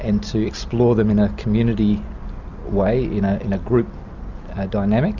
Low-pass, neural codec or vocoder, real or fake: 7.2 kHz; none; real